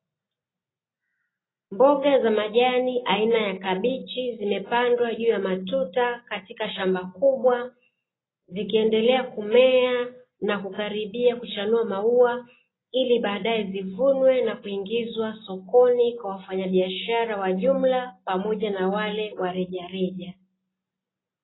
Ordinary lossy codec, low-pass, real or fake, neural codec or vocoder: AAC, 16 kbps; 7.2 kHz; real; none